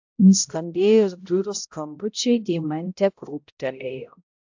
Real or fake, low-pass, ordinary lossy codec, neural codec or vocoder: fake; 7.2 kHz; AAC, 48 kbps; codec, 16 kHz, 0.5 kbps, X-Codec, HuBERT features, trained on balanced general audio